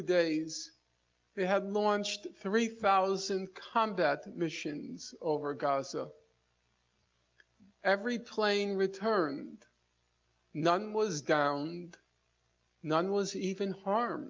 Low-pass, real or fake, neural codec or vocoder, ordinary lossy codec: 7.2 kHz; real; none; Opus, 24 kbps